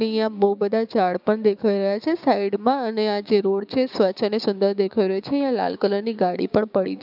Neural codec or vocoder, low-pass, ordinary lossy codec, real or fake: codec, 16 kHz, 6 kbps, DAC; 5.4 kHz; none; fake